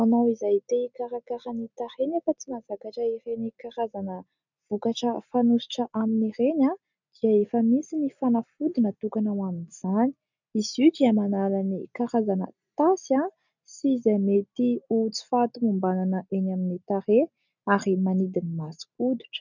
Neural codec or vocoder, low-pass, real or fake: none; 7.2 kHz; real